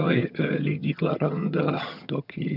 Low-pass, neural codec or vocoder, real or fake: 5.4 kHz; vocoder, 22.05 kHz, 80 mel bands, HiFi-GAN; fake